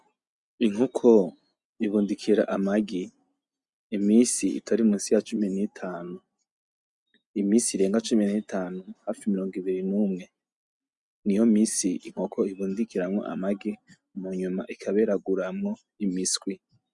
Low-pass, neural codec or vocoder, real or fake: 10.8 kHz; none; real